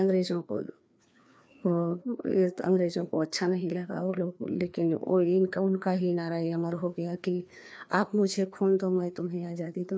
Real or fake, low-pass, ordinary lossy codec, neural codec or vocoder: fake; none; none; codec, 16 kHz, 2 kbps, FreqCodec, larger model